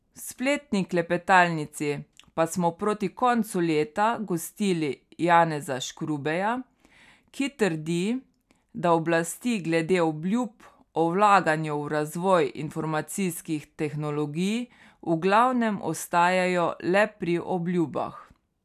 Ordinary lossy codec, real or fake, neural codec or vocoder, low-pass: none; real; none; 14.4 kHz